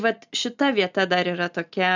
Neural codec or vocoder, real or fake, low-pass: none; real; 7.2 kHz